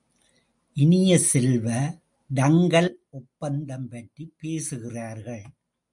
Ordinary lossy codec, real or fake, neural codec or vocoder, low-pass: MP3, 64 kbps; real; none; 10.8 kHz